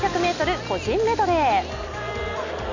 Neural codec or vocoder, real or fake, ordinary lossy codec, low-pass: none; real; none; 7.2 kHz